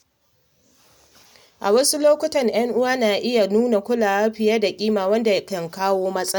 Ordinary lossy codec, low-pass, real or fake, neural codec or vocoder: none; none; real; none